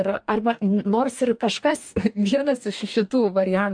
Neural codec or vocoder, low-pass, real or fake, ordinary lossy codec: codec, 44.1 kHz, 2.6 kbps, DAC; 9.9 kHz; fake; MP3, 64 kbps